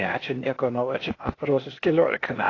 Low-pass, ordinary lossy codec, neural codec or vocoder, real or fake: 7.2 kHz; AAC, 32 kbps; codec, 16 kHz, 0.8 kbps, ZipCodec; fake